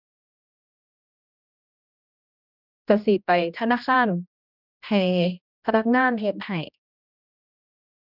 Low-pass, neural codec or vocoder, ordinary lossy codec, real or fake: 5.4 kHz; codec, 16 kHz, 1 kbps, X-Codec, HuBERT features, trained on general audio; none; fake